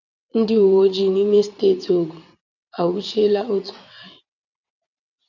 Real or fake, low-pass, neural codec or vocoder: fake; 7.2 kHz; vocoder, 22.05 kHz, 80 mel bands, WaveNeXt